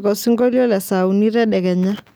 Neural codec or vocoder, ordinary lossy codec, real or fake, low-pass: none; none; real; none